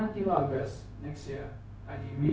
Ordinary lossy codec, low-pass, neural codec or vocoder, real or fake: none; none; codec, 16 kHz, 0.4 kbps, LongCat-Audio-Codec; fake